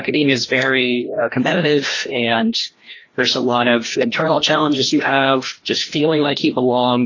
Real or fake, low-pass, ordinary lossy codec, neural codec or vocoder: fake; 7.2 kHz; AAC, 32 kbps; codec, 16 kHz, 1 kbps, FreqCodec, larger model